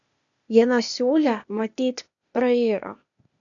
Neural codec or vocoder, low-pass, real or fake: codec, 16 kHz, 0.8 kbps, ZipCodec; 7.2 kHz; fake